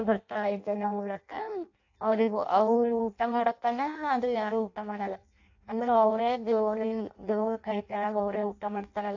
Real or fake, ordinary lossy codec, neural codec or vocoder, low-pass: fake; AAC, 48 kbps; codec, 16 kHz in and 24 kHz out, 0.6 kbps, FireRedTTS-2 codec; 7.2 kHz